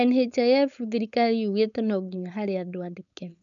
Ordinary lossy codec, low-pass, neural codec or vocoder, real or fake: none; 7.2 kHz; codec, 16 kHz, 4.8 kbps, FACodec; fake